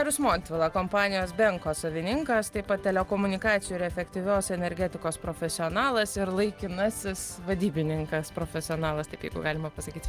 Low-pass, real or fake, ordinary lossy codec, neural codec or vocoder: 14.4 kHz; real; Opus, 32 kbps; none